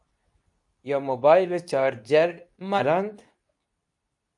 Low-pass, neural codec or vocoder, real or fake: 10.8 kHz; codec, 24 kHz, 0.9 kbps, WavTokenizer, medium speech release version 2; fake